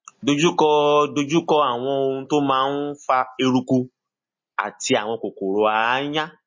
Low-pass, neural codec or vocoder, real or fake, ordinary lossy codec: 7.2 kHz; none; real; MP3, 32 kbps